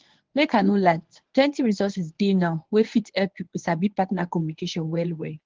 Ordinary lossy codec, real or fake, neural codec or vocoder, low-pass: Opus, 16 kbps; fake; codec, 24 kHz, 0.9 kbps, WavTokenizer, medium speech release version 1; 7.2 kHz